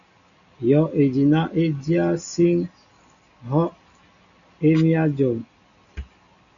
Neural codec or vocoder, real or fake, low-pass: none; real; 7.2 kHz